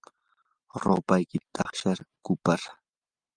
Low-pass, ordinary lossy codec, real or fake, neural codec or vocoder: 9.9 kHz; Opus, 32 kbps; real; none